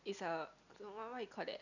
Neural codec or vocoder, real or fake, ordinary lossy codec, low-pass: none; real; none; 7.2 kHz